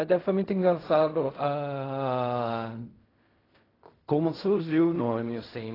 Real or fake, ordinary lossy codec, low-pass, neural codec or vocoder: fake; AAC, 24 kbps; 5.4 kHz; codec, 16 kHz in and 24 kHz out, 0.4 kbps, LongCat-Audio-Codec, fine tuned four codebook decoder